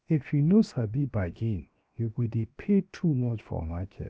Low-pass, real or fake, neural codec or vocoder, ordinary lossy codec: none; fake; codec, 16 kHz, 0.7 kbps, FocalCodec; none